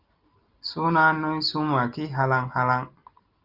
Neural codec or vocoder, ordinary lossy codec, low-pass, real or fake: none; Opus, 24 kbps; 5.4 kHz; real